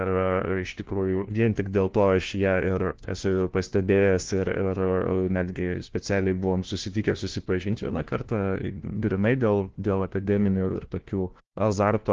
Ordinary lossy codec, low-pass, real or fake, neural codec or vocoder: Opus, 16 kbps; 7.2 kHz; fake; codec, 16 kHz, 1 kbps, FunCodec, trained on LibriTTS, 50 frames a second